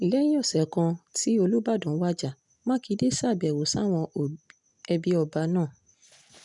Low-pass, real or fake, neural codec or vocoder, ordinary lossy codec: 10.8 kHz; real; none; none